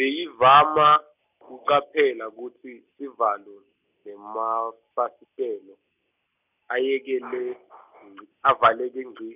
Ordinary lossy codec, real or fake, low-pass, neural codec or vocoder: none; real; 3.6 kHz; none